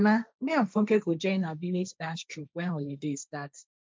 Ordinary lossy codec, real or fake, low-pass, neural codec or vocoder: none; fake; none; codec, 16 kHz, 1.1 kbps, Voila-Tokenizer